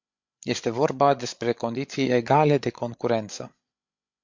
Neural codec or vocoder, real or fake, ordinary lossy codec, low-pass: codec, 16 kHz, 8 kbps, FreqCodec, larger model; fake; MP3, 48 kbps; 7.2 kHz